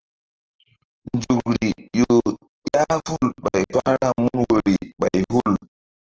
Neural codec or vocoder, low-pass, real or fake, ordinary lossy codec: none; 7.2 kHz; real; Opus, 16 kbps